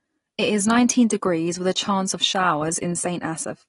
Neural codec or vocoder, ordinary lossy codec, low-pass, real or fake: none; AAC, 32 kbps; 10.8 kHz; real